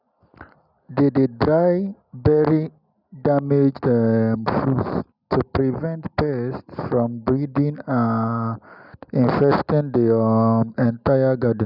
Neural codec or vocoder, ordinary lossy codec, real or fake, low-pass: none; none; real; 5.4 kHz